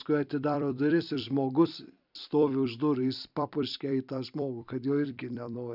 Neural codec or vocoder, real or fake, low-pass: vocoder, 44.1 kHz, 128 mel bands every 256 samples, BigVGAN v2; fake; 5.4 kHz